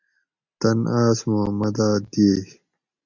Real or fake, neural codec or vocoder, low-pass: real; none; 7.2 kHz